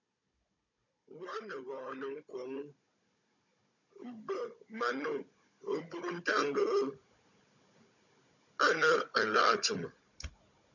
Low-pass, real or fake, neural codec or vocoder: 7.2 kHz; fake; codec, 16 kHz, 16 kbps, FunCodec, trained on Chinese and English, 50 frames a second